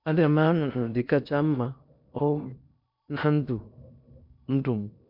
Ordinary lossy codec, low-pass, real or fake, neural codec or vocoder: MP3, 48 kbps; 5.4 kHz; fake; codec, 16 kHz in and 24 kHz out, 0.8 kbps, FocalCodec, streaming, 65536 codes